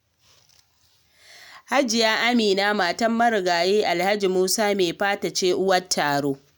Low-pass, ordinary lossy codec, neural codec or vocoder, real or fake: none; none; none; real